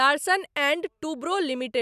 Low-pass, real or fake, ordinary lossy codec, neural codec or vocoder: 14.4 kHz; real; none; none